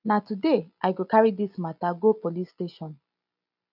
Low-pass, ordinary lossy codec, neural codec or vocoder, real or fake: 5.4 kHz; none; none; real